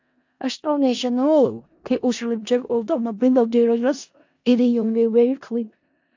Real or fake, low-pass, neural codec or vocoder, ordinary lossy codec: fake; 7.2 kHz; codec, 16 kHz in and 24 kHz out, 0.4 kbps, LongCat-Audio-Codec, four codebook decoder; AAC, 48 kbps